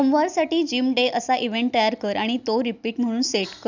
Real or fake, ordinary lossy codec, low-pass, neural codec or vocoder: real; none; 7.2 kHz; none